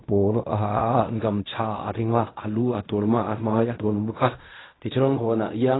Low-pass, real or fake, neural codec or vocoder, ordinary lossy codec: 7.2 kHz; fake; codec, 16 kHz in and 24 kHz out, 0.4 kbps, LongCat-Audio-Codec, fine tuned four codebook decoder; AAC, 16 kbps